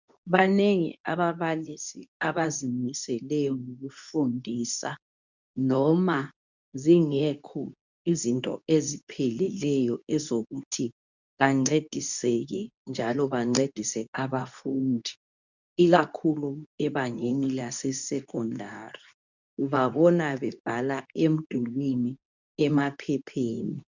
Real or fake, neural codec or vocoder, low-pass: fake; codec, 24 kHz, 0.9 kbps, WavTokenizer, medium speech release version 2; 7.2 kHz